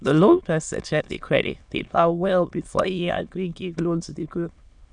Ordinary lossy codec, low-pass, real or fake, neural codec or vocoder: none; 9.9 kHz; fake; autoencoder, 22.05 kHz, a latent of 192 numbers a frame, VITS, trained on many speakers